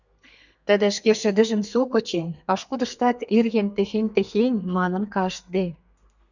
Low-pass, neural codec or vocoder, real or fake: 7.2 kHz; codec, 32 kHz, 1.9 kbps, SNAC; fake